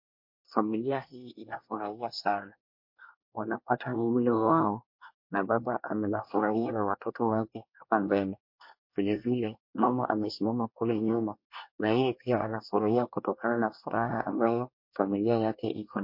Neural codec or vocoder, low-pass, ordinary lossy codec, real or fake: codec, 24 kHz, 1 kbps, SNAC; 5.4 kHz; MP3, 32 kbps; fake